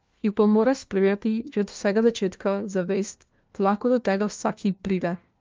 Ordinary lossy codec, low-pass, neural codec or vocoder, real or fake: Opus, 24 kbps; 7.2 kHz; codec, 16 kHz, 1 kbps, FunCodec, trained on LibriTTS, 50 frames a second; fake